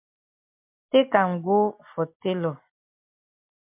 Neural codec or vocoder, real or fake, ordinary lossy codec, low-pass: none; real; MP3, 32 kbps; 3.6 kHz